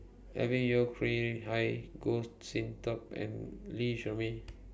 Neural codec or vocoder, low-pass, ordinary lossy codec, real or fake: none; none; none; real